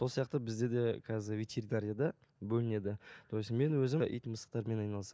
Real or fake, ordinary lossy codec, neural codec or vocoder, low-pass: real; none; none; none